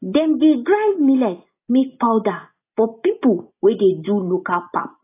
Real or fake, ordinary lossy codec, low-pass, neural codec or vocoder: real; AAC, 24 kbps; 3.6 kHz; none